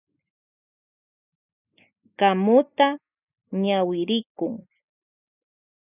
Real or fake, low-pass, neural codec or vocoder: real; 3.6 kHz; none